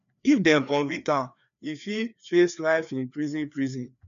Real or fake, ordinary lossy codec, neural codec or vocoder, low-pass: fake; none; codec, 16 kHz, 2 kbps, FreqCodec, larger model; 7.2 kHz